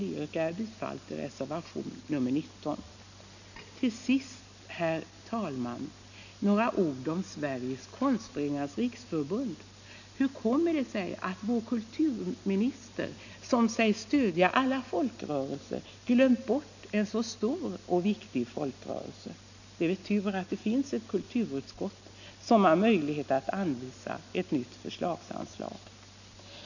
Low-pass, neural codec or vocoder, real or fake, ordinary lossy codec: 7.2 kHz; none; real; none